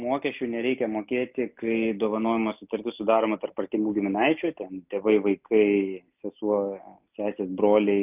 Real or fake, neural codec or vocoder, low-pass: real; none; 3.6 kHz